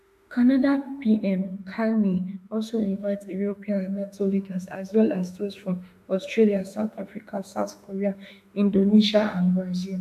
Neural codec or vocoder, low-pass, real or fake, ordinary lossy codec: autoencoder, 48 kHz, 32 numbers a frame, DAC-VAE, trained on Japanese speech; 14.4 kHz; fake; none